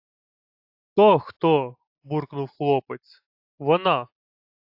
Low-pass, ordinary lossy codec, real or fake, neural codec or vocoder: 5.4 kHz; AAC, 48 kbps; real; none